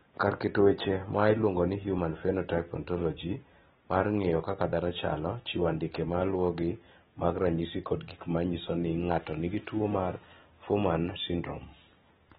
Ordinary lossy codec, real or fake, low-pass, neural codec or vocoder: AAC, 16 kbps; real; 7.2 kHz; none